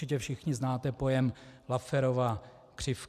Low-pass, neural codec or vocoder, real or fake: 14.4 kHz; none; real